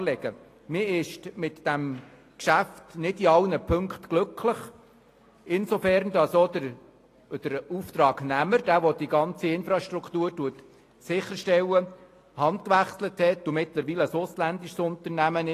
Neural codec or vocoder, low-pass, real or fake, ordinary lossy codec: none; 14.4 kHz; real; AAC, 48 kbps